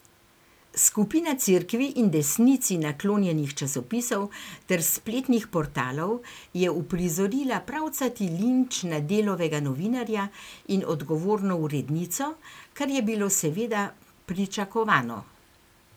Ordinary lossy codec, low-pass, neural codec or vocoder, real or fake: none; none; none; real